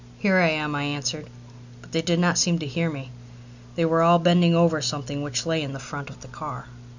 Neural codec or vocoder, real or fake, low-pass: none; real; 7.2 kHz